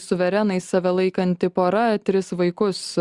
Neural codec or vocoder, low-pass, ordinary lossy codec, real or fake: none; 10.8 kHz; Opus, 64 kbps; real